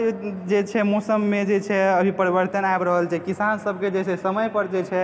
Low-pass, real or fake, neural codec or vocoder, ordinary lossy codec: none; real; none; none